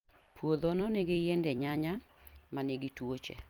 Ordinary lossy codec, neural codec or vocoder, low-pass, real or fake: Opus, 32 kbps; vocoder, 44.1 kHz, 128 mel bands every 256 samples, BigVGAN v2; 19.8 kHz; fake